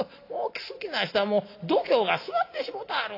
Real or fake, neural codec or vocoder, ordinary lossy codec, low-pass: real; none; AAC, 32 kbps; 5.4 kHz